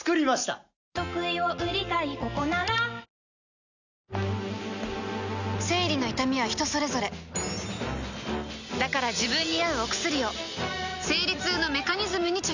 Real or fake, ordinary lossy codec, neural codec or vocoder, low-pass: real; none; none; 7.2 kHz